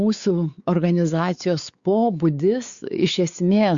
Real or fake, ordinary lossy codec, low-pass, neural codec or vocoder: fake; Opus, 64 kbps; 7.2 kHz; codec, 16 kHz, 4 kbps, X-Codec, WavLM features, trained on Multilingual LibriSpeech